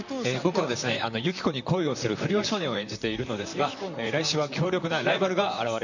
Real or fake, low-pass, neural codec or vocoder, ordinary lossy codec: fake; 7.2 kHz; vocoder, 44.1 kHz, 128 mel bands, Pupu-Vocoder; none